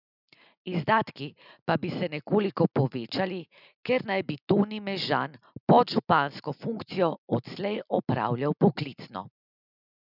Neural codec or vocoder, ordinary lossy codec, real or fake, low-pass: none; none; real; 5.4 kHz